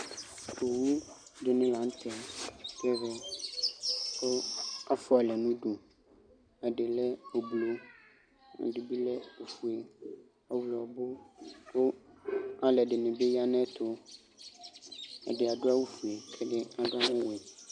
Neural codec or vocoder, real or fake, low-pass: none; real; 9.9 kHz